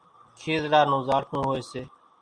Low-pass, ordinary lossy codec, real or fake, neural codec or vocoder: 9.9 kHz; Opus, 64 kbps; real; none